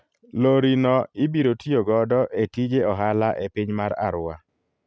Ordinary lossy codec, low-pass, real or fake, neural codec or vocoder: none; none; real; none